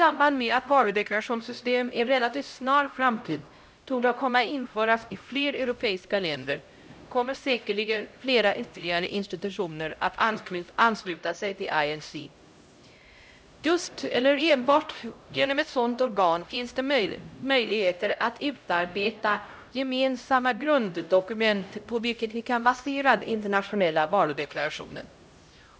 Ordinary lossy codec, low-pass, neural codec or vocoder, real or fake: none; none; codec, 16 kHz, 0.5 kbps, X-Codec, HuBERT features, trained on LibriSpeech; fake